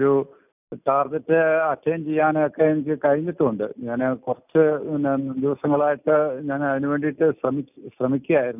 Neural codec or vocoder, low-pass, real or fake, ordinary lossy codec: none; 3.6 kHz; real; none